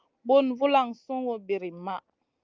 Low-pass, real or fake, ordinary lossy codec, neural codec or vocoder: 7.2 kHz; real; Opus, 24 kbps; none